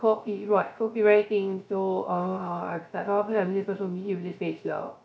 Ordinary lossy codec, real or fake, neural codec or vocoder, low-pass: none; fake; codec, 16 kHz, 0.3 kbps, FocalCodec; none